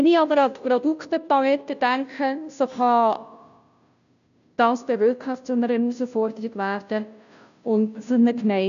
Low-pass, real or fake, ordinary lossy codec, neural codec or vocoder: 7.2 kHz; fake; none; codec, 16 kHz, 0.5 kbps, FunCodec, trained on Chinese and English, 25 frames a second